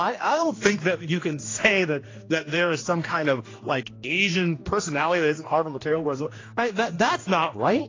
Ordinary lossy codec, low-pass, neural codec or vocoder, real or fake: AAC, 32 kbps; 7.2 kHz; codec, 16 kHz, 1 kbps, X-Codec, HuBERT features, trained on general audio; fake